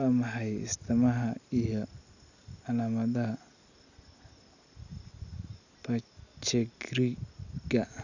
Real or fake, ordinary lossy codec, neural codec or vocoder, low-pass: real; none; none; 7.2 kHz